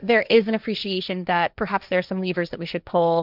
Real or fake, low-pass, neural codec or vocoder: fake; 5.4 kHz; codec, 16 kHz, 1.1 kbps, Voila-Tokenizer